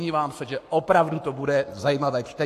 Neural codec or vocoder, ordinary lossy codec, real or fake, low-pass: codec, 44.1 kHz, 7.8 kbps, Pupu-Codec; AAC, 64 kbps; fake; 14.4 kHz